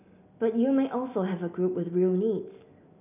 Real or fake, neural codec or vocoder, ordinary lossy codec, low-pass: real; none; none; 3.6 kHz